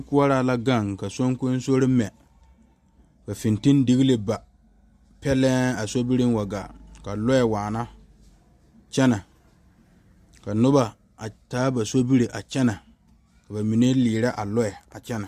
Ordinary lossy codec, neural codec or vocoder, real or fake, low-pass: Opus, 64 kbps; none; real; 14.4 kHz